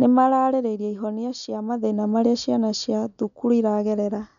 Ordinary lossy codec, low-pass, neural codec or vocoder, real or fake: none; 7.2 kHz; none; real